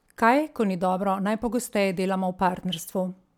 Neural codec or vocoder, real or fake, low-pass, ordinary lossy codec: none; real; 19.8 kHz; MP3, 96 kbps